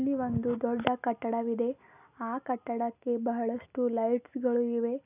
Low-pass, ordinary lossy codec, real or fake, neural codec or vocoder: 3.6 kHz; none; real; none